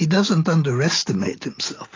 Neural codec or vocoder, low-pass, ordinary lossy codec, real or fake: none; 7.2 kHz; AAC, 32 kbps; real